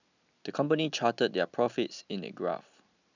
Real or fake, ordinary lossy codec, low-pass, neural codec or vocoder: real; none; 7.2 kHz; none